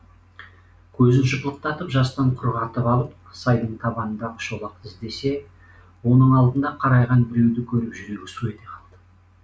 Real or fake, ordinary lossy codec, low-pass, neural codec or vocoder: real; none; none; none